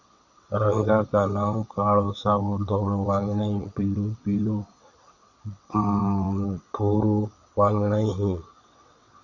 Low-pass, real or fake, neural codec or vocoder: 7.2 kHz; fake; vocoder, 22.05 kHz, 80 mel bands, WaveNeXt